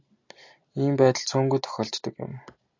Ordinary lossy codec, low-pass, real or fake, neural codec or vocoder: AAC, 48 kbps; 7.2 kHz; real; none